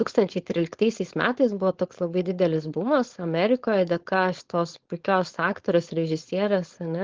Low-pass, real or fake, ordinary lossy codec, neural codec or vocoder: 7.2 kHz; fake; Opus, 16 kbps; codec, 16 kHz, 4.8 kbps, FACodec